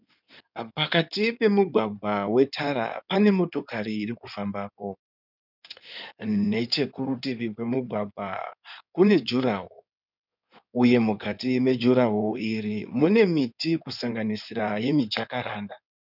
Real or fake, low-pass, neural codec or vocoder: fake; 5.4 kHz; codec, 16 kHz in and 24 kHz out, 2.2 kbps, FireRedTTS-2 codec